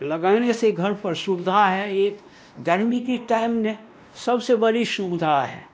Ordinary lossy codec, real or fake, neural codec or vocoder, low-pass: none; fake; codec, 16 kHz, 1 kbps, X-Codec, WavLM features, trained on Multilingual LibriSpeech; none